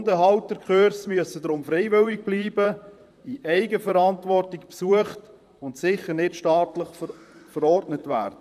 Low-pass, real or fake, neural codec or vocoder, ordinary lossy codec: 14.4 kHz; fake; vocoder, 44.1 kHz, 128 mel bands, Pupu-Vocoder; none